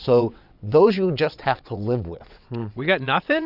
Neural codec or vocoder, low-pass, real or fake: vocoder, 22.05 kHz, 80 mel bands, WaveNeXt; 5.4 kHz; fake